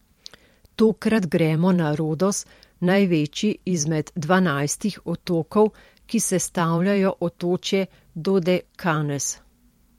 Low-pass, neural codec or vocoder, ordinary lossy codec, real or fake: 19.8 kHz; vocoder, 44.1 kHz, 128 mel bands every 512 samples, BigVGAN v2; MP3, 64 kbps; fake